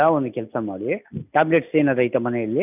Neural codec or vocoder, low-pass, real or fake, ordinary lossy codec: none; 3.6 kHz; real; none